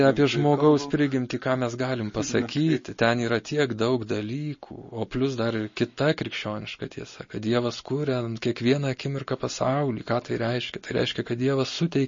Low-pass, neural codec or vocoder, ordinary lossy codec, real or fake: 7.2 kHz; none; MP3, 32 kbps; real